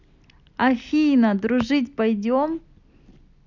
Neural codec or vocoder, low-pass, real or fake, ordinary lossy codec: none; 7.2 kHz; real; none